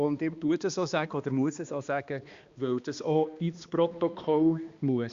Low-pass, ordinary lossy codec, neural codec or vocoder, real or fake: 7.2 kHz; Opus, 64 kbps; codec, 16 kHz, 2 kbps, X-Codec, HuBERT features, trained on balanced general audio; fake